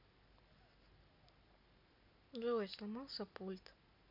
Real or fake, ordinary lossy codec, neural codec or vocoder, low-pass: real; none; none; 5.4 kHz